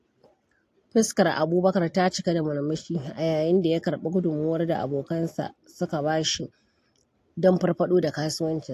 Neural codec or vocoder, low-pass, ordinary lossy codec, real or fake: none; 14.4 kHz; AAC, 64 kbps; real